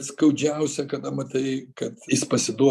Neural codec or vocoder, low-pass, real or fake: none; 14.4 kHz; real